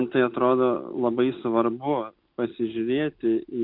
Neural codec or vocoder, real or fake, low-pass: none; real; 5.4 kHz